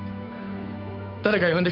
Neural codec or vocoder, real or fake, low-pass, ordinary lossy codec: codec, 16 kHz, 8 kbps, FunCodec, trained on Chinese and English, 25 frames a second; fake; 5.4 kHz; none